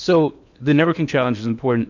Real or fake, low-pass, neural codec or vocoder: fake; 7.2 kHz; codec, 16 kHz in and 24 kHz out, 0.8 kbps, FocalCodec, streaming, 65536 codes